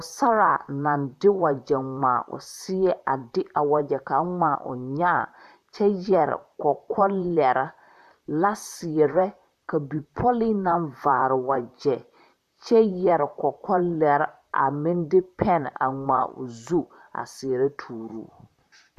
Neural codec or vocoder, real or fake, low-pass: vocoder, 44.1 kHz, 128 mel bands, Pupu-Vocoder; fake; 14.4 kHz